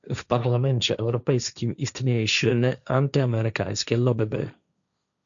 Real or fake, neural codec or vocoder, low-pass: fake; codec, 16 kHz, 1.1 kbps, Voila-Tokenizer; 7.2 kHz